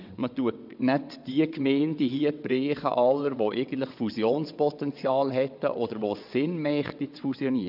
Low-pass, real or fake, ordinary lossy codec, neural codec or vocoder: 5.4 kHz; fake; none; vocoder, 22.05 kHz, 80 mel bands, WaveNeXt